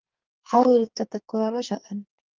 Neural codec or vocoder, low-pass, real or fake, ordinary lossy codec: codec, 44.1 kHz, 2.6 kbps, SNAC; 7.2 kHz; fake; Opus, 24 kbps